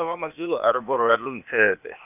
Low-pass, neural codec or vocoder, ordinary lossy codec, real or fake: 3.6 kHz; codec, 16 kHz, 0.8 kbps, ZipCodec; none; fake